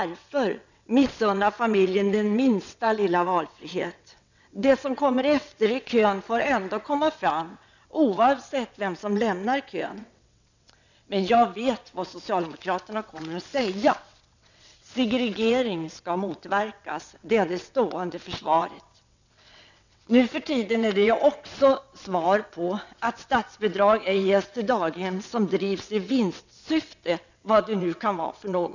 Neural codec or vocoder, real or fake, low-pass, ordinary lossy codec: vocoder, 22.05 kHz, 80 mel bands, WaveNeXt; fake; 7.2 kHz; none